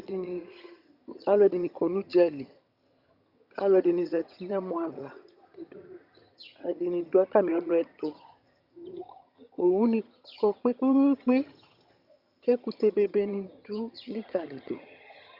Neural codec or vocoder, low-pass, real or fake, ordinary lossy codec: vocoder, 22.05 kHz, 80 mel bands, HiFi-GAN; 5.4 kHz; fake; Opus, 64 kbps